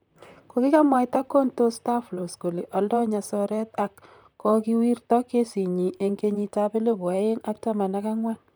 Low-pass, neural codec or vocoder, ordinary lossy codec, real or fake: none; vocoder, 44.1 kHz, 128 mel bands, Pupu-Vocoder; none; fake